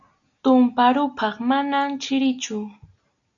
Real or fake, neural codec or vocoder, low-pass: real; none; 7.2 kHz